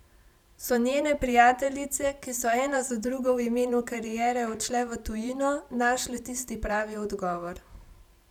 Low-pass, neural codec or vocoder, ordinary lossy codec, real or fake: 19.8 kHz; vocoder, 44.1 kHz, 128 mel bands every 512 samples, BigVGAN v2; none; fake